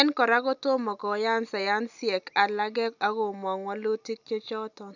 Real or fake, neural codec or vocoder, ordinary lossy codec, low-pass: real; none; none; 7.2 kHz